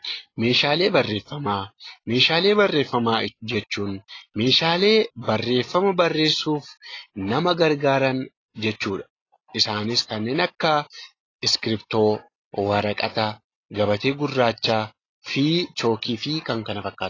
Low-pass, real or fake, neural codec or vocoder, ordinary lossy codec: 7.2 kHz; real; none; AAC, 32 kbps